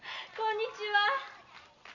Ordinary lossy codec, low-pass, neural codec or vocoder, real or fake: none; 7.2 kHz; none; real